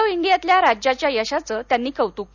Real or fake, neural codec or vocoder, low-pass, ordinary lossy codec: real; none; 7.2 kHz; none